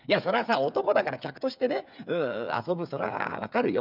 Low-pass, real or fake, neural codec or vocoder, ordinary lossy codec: 5.4 kHz; fake; codec, 16 kHz, 8 kbps, FreqCodec, smaller model; none